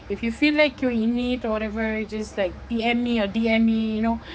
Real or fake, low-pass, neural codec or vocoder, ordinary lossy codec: fake; none; codec, 16 kHz, 4 kbps, X-Codec, HuBERT features, trained on general audio; none